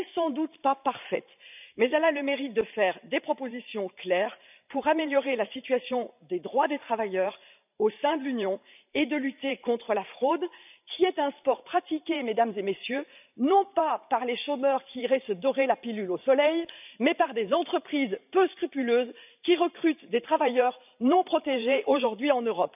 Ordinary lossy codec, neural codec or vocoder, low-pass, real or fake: none; vocoder, 44.1 kHz, 128 mel bands every 512 samples, BigVGAN v2; 3.6 kHz; fake